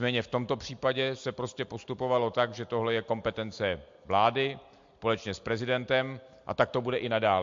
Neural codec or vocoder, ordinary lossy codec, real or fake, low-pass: none; MP3, 48 kbps; real; 7.2 kHz